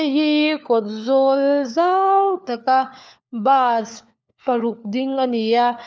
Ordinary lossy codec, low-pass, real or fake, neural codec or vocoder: none; none; fake; codec, 16 kHz, 4 kbps, FunCodec, trained on LibriTTS, 50 frames a second